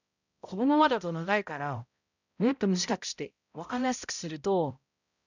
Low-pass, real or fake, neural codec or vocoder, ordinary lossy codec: 7.2 kHz; fake; codec, 16 kHz, 0.5 kbps, X-Codec, HuBERT features, trained on balanced general audio; none